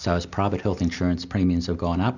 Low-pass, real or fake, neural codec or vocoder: 7.2 kHz; real; none